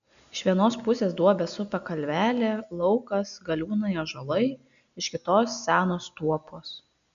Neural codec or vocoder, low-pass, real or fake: none; 7.2 kHz; real